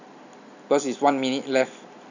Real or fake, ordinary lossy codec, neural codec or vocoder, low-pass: real; none; none; 7.2 kHz